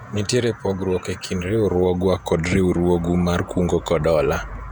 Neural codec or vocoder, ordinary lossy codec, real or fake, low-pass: vocoder, 48 kHz, 128 mel bands, Vocos; none; fake; 19.8 kHz